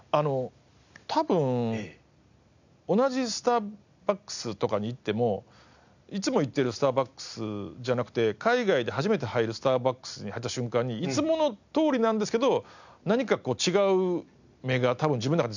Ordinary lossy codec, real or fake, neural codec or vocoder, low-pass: none; real; none; 7.2 kHz